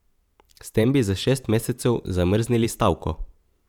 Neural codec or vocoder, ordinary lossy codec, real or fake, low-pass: vocoder, 44.1 kHz, 128 mel bands every 512 samples, BigVGAN v2; none; fake; 19.8 kHz